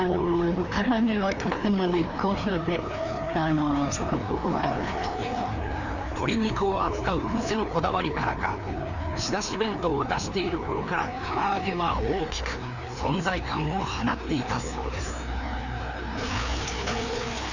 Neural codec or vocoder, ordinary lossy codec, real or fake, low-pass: codec, 16 kHz, 2 kbps, FreqCodec, larger model; none; fake; 7.2 kHz